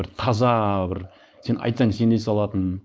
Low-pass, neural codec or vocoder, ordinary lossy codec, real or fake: none; codec, 16 kHz, 4.8 kbps, FACodec; none; fake